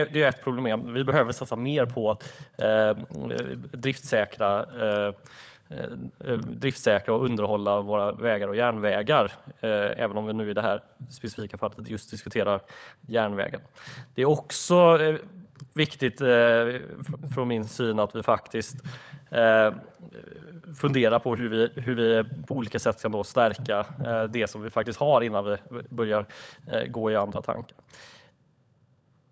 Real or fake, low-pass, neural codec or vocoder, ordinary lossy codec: fake; none; codec, 16 kHz, 16 kbps, FunCodec, trained on LibriTTS, 50 frames a second; none